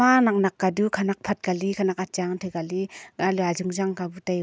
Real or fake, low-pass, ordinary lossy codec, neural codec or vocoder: real; none; none; none